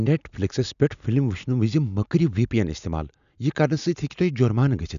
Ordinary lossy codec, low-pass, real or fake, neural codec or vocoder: none; 7.2 kHz; real; none